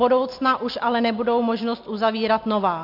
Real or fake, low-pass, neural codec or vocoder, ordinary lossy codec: real; 5.4 kHz; none; MP3, 48 kbps